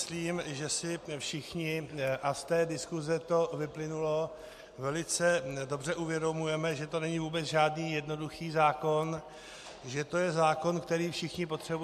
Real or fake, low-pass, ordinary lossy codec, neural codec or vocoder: real; 14.4 kHz; MP3, 64 kbps; none